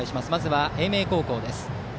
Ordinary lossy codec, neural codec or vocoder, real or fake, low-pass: none; none; real; none